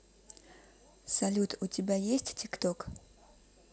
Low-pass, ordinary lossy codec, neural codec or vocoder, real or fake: none; none; none; real